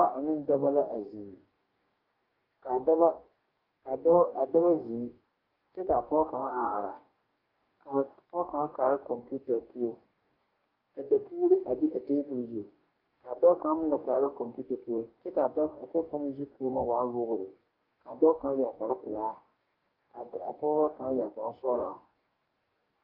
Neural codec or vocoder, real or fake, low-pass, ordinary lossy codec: codec, 44.1 kHz, 2.6 kbps, DAC; fake; 5.4 kHz; Opus, 24 kbps